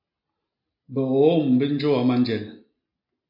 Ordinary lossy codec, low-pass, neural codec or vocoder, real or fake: AAC, 48 kbps; 5.4 kHz; none; real